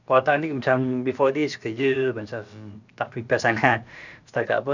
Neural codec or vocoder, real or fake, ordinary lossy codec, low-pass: codec, 16 kHz, about 1 kbps, DyCAST, with the encoder's durations; fake; none; 7.2 kHz